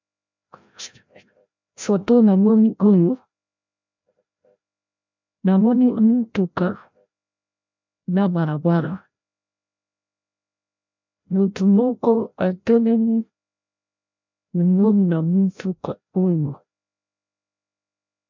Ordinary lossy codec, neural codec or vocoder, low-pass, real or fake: MP3, 64 kbps; codec, 16 kHz, 0.5 kbps, FreqCodec, larger model; 7.2 kHz; fake